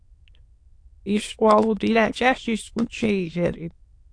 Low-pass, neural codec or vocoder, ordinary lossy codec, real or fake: 9.9 kHz; autoencoder, 22.05 kHz, a latent of 192 numbers a frame, VITS, trained on many speakers; AAC, 48 kbps; fake